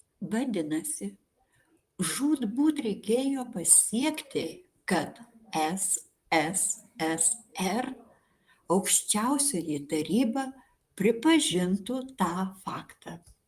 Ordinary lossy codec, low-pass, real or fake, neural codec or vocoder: Opus, 32 kbps; 14.4 kHz; fake; vocoder, 44.1 kHz, 128 mel bands, Pupu-Vocoder